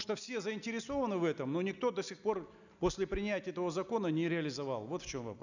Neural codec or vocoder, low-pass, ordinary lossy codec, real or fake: none; 7.2 kHz; none; real